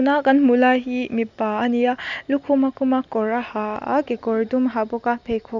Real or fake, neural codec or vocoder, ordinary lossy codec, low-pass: real; none; none; 7.2 kHz